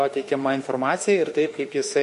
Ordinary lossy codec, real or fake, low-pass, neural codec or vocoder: MP3, 48 kbps; fake; 14.4 kHz; autoencoder, 48 kHz, 32 numbers a frame, DAC-VAE, trained on Japanese speech